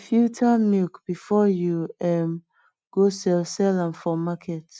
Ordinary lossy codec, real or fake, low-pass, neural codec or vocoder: none; real; none; none